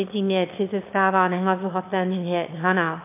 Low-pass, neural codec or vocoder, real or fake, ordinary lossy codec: 3.6 kHz; autoencoder, 22.05 kHz, a latent of 192 numbers a frame, VITS, trained on one speaker; fake; MP3, 32 kbps